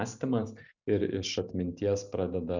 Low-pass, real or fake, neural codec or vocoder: 7.2 kHz; real; none